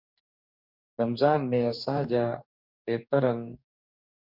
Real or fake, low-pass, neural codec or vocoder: fake; 5.4 kHz; codec, 44.1 kHz, 2.6 kbps, DAC